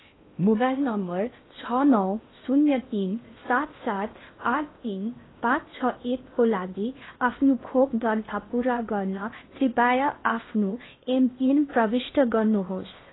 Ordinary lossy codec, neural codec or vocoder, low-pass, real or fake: AAC, 16 kbps; codec, 16 kHz in and 24 kHz out, 0.6 kbps, FocalCodec, streaming, 2048 codes; 7.2 kHz; fake